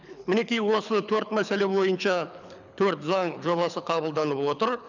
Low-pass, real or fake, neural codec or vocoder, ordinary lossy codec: 7.2 kHz; fake; codec, 24 kHz, 6 kbps, HILCodec; none